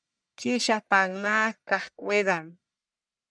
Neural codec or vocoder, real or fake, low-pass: codec, 44.1 kHz, 1.7 kbps, Pupu-Codec; fake; 9.9 kHz